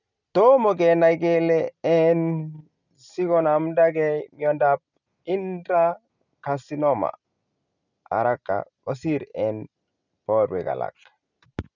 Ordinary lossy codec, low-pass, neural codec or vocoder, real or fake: none; 7.2 kHz; none; real